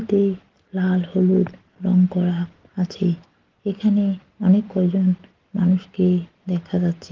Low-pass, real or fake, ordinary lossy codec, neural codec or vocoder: 7.2 kHz; real; Opus, 16 kbps; none